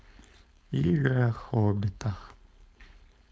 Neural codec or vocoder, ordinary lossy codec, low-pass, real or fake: codec, 16 kHz, 4.8 kbps, FACodec; none; none; fake